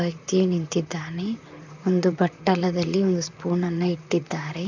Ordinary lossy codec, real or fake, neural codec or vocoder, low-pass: none; fake; vocoder, 44.1 kHz, 128 mel bands, Pupu-Vocoder; 7.2 kHz